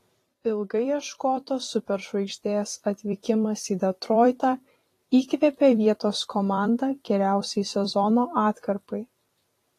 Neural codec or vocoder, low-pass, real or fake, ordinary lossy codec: vocoder, 44.1 kHz, 128 mel bands every 256 samples, BigVGAN v2; 14.4 kHz; fake; AAC, 48 kbps